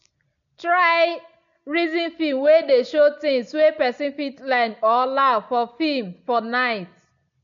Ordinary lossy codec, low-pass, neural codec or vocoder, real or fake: none; 7.2 kHz; none; real